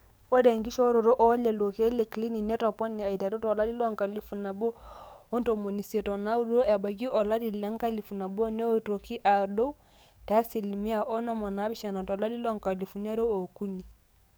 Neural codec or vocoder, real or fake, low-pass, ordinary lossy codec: codec, 44.1 kHz, 7.8 kbps, DAC; fake; none; none